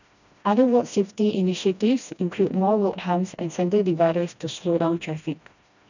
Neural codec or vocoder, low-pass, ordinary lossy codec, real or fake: codec, 16 kHz, 1 kbps, FreqCodec, smaller model; 7.2 kHz; none; fake